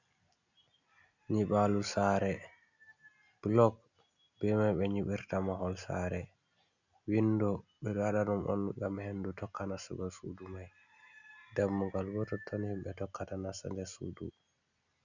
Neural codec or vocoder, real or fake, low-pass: none; real; 7.2 kHz